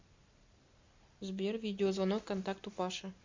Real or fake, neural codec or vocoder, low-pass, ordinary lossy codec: real; none; 7.2 kHz; MP3, 48 kbps